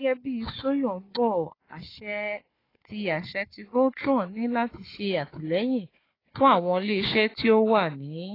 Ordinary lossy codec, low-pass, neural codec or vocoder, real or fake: AAC, 24 kbps; 5.4 kHz; codec, 16 kHz, 4 kbps, FunCodec, trained on Chinese and English, 50 frames a second; fake